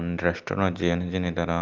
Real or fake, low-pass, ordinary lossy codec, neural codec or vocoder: real; 7.2 kHz; Opus, 24 kbps; none